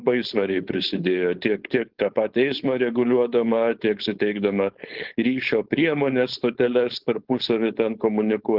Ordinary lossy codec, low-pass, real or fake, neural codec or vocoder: Opus, 16 kbps; 5.4 kHz; fake; codec, 16 kHz, 4.8 kbps, FACodec